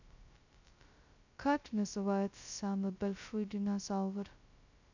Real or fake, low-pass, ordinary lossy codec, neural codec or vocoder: fake; 7.2 kHz; none; codec, 16 kHz, 0.2 kbps, FocalCodec